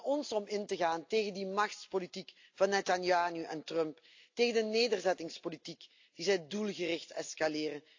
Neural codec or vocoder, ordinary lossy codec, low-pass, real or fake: none; AAC, 48 kbps; 7.2 kHz; real